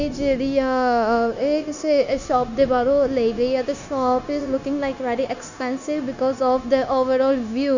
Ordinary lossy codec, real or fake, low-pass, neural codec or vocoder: none; fake; 7.2 kHz; codec, 16 kHz, 0.9 kbps, LongCat-Audio-Codec